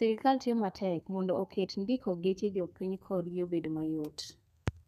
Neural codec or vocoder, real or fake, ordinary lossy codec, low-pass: codec, 32 kHz, 1.9 kbps, SNAC; fake; none; 14.4 kHz